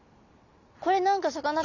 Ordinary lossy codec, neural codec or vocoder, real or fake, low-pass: none; none; real; 7.2 kHz